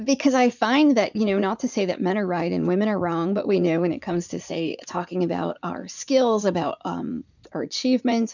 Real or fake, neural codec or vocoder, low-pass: fake; vocoder, 44.1 kHz, 80 mel bands, Vocos; 7.2 kHz